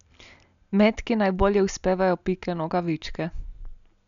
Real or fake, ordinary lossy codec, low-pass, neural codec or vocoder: real; none; 7.2 kHz; none